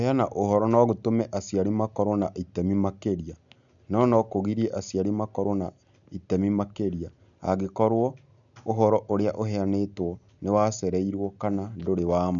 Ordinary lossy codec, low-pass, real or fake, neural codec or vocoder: none; 7.2 kHz; real; none